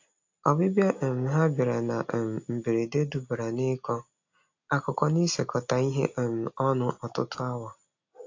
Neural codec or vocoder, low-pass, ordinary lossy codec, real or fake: none; 7.2 kHz; AAC, 48 kbps; real